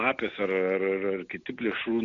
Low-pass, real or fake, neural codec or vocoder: 7.2 kHz; real; none